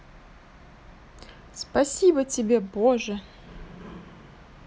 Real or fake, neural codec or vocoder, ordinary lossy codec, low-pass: real; none; none; none